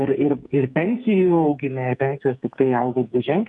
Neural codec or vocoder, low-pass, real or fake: codec, 44.1 kHz, 2.6 kbps, SNAC; 10.8 kHz; fake